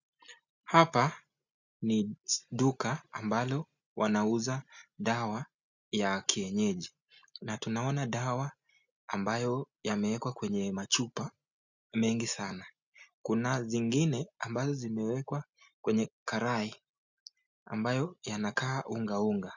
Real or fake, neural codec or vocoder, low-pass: real; none; 7.2 kHz